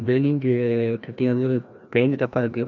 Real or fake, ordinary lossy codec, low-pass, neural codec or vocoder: fake; none; 7.2 kHz; codec, 16 kHz, 1 kbps, FreqCodec, larger model